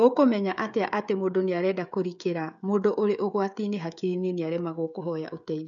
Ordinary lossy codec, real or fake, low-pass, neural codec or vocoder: none; fake; 7.2 kHz; codec, 16 kHz, 16 kbps, FreqCodec, smaller model